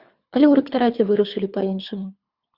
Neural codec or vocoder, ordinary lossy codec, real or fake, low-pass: codec, 24 kHz, 3 kbps, HILCodec; Opus, 64 kbps; fake; 5.4 kHz